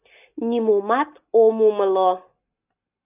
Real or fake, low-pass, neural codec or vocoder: real; 3.6 kHz; none